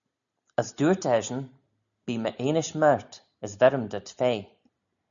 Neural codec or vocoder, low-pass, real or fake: none; 7.2 kHz; real